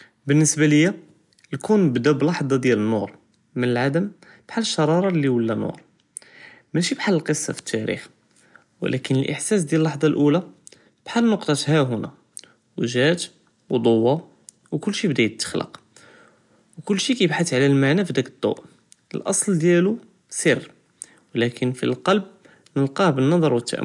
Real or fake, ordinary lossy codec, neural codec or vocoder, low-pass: real; none; none; 10.8 kHz